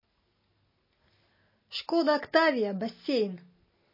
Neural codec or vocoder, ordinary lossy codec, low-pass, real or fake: none; MP3, 24 kbps; 5.4 kHz; real